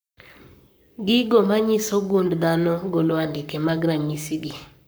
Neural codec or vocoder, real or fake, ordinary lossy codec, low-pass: codec, 44.1 kHz, 7.8 kbps, Pupu-Codec; fake; none; none